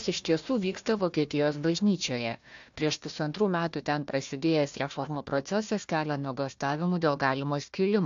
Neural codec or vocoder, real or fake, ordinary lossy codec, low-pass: codec, 16 kHz, 1 kbps, FunCodec, trained on Chinese and English, 50 frames a second; fake; AAC, 64 kbps; 7.2 kHz